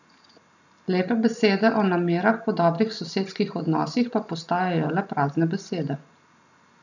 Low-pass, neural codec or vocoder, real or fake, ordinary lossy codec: 7.2 kHz; none; real; none